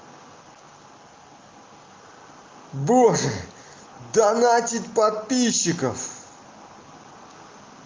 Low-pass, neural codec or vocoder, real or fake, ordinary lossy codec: 7.2 kHz; none; real; Opus, 24 kbps